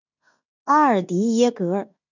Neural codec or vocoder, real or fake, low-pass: codec, 16 kHz in and 24 kHz out, 0.9 kbps, LongCat-Audio-Codec, fine tuned four codebook decoder; fake; 7.2 kHz